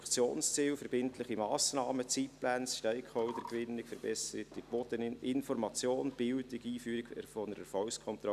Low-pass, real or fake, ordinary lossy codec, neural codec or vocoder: 14.4 kHz; real; none; none